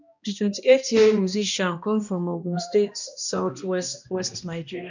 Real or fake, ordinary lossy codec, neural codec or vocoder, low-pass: fake; none; codec, 16 kHz, 1 kbps, X-Codec, HuBERT features, trained on balanced general audio; 7.2 kHz